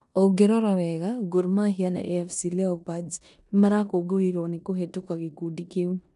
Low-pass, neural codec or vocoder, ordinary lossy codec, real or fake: 10.8 kHz; codec, 16 kHz in and 24 kHz out, 0.9 kbps, LongCat-Audio-Codec, four codebook decoder; none; fake